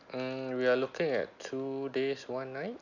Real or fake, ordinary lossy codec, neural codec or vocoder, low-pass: real; none; none; 7.2 kHz